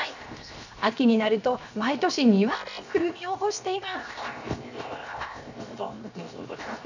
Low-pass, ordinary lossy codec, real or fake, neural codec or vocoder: 7.2 kHz; none; fake; codec, 16 kHz, 0.7 kbps, FocalCodec